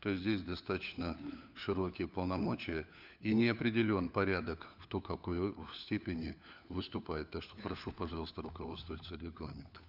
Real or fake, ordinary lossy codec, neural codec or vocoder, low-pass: fake; none; codec, 16 kHz, 4 kbps, FunCodec, trained on LibriTTS, 50 frames a second; 5.4 kHz